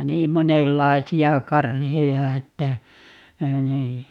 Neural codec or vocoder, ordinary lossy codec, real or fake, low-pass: autoencoder, 48 kHz, 32 numbers a frame, DAC-VAE, trained on Japanese speech; none; fake; 19.8 kHz